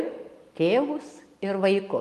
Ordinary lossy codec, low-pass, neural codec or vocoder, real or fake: Opus, 32 kbps; 14.4 kHz; none; real